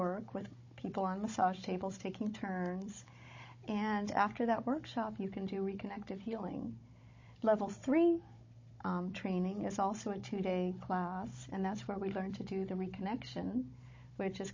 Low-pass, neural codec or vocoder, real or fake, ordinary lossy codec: 7.2 kHz; codec, 16 kHz, 16 kbps, FunCodec, trained on Chinese and English, 50 frames a second; fake; MP3, 32 kbps